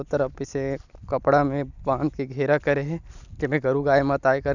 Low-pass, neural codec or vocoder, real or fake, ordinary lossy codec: 7.2 kHz; none; real; none